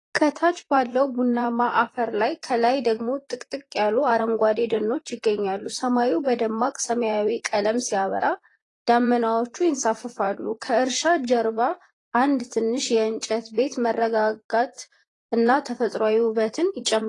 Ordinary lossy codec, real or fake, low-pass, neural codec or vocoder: AAC, 32 kbps; fake; 10.8 kHz; vocoder, 44.1 kHz, 128 mel bands, Pupu-Vocoder